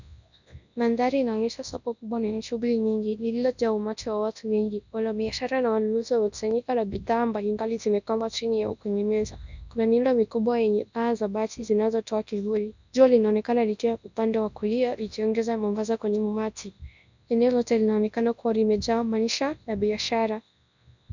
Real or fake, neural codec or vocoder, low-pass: fake; codec, 24 kHz, 0.9 kbps, WavTokenizer, large speech release; 7.2 kHz